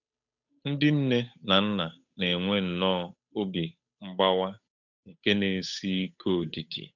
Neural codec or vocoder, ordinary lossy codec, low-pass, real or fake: codec, 16 kHz, 8 kbps, FunCodec, trained on Chinese and English, 25 frames a second; none; 7.2 kHz; fake